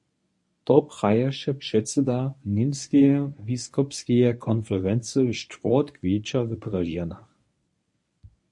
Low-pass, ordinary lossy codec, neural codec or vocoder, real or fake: 10.8 kHz; MP3, 48 kbps; codec, 24 kHz, 0.9 kbps, WavTokenizer, medium speech release version 1; fake